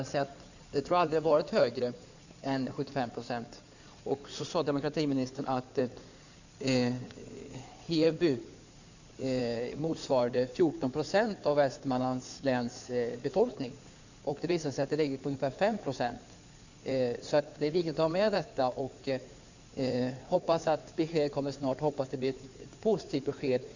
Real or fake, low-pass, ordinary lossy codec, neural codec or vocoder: fake; 7.2 kHz; none; codec, 16 kHz in and 24 kHz out, 2.2 kbps, FireRedTTS-2 codec